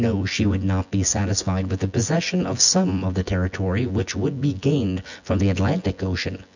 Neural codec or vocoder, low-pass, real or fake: vocoder, 24 kHz, 100 mel bands, Vocos; 7.2 kHz; fake